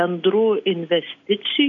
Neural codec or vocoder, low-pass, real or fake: none; 7.2 kHz; real